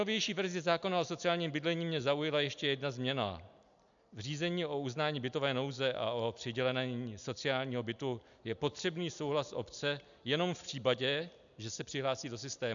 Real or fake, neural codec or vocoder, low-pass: real; none; 7.2 kHz